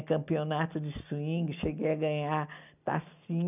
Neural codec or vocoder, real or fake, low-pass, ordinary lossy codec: none; real; 3.6 kHz; none